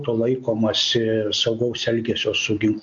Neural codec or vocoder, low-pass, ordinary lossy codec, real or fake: none; 7.2 kHz; MP3, 48 kbps; real